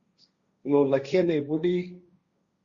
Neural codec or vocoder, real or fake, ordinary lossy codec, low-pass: codec, 16 kHz, 1.1 kbps, Voila-Tokenizer; fake; Opus, 64 kbps; 7.2 kHz